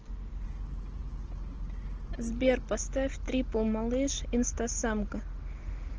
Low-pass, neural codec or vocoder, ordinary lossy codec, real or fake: 7.2 kHz; none; Opus, 16 kbps; real